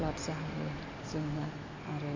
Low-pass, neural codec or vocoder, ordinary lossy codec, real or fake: 7.2 kHz; none; MP3, 48 kbps; real